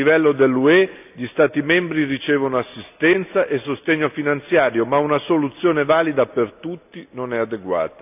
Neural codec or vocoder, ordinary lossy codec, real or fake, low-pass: none; none; real; 3.6 kHz